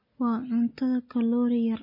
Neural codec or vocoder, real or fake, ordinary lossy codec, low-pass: none; real; MP3, 24 kbps; 5.4 kHz